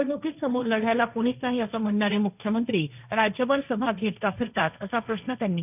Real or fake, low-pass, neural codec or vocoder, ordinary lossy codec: fake; 3.6 kHz; codec, 16 kHz, 1.1 kbps, Voila-Tokenizer; none